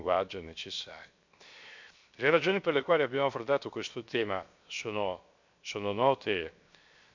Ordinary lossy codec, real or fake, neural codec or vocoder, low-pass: MP3, 64 kbps; fake; codec, 16 kHz, 0.7 kbps, FocalCodec; 7.2 kHz